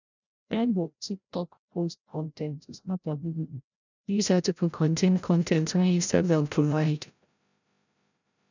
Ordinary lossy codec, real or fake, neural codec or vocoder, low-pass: none; fake; codec, 16 kHz, 0.5 kbps, FreqCodec, larger model; 7.2 kHz